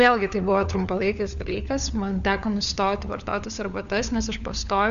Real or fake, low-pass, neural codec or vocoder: fake; 7.2 kHz; codec, 16 kHz, 4 kbps, FunCodec, trained on LibriTTS, 50 frames a second